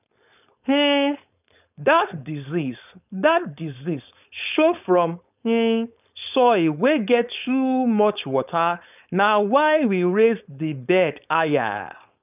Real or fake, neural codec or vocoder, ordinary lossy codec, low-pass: fake; codec, 16 kHz, 4.8 kbps, FACodec; none; 3.6 kHz